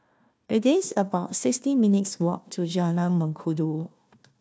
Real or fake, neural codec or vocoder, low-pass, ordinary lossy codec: fake; codec, 16 kHz, 1 kbps, FunCodec, trained on Chinese and English, 50 frames a second; none; none